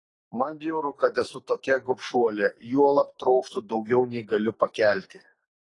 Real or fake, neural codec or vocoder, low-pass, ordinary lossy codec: fake; codec, 44.1 kHz, 2.6 kbps, SNAC; 10.8 kHz; AAC, 32 kbps